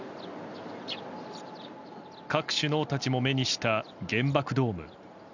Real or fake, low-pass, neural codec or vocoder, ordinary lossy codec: real; 7.2 kHz; none; none